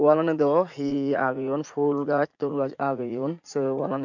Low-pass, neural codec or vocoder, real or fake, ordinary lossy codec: 7.2 kHz; vocoder, 44.1 kHz, 80 mel bands, Vocos; fake; none